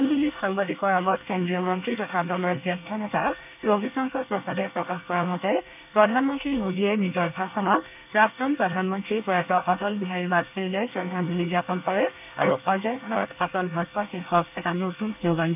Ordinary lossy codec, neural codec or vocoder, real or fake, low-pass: none; codec, 24 kHz, 1 kbps, SNAC; fake; 3.6 kHz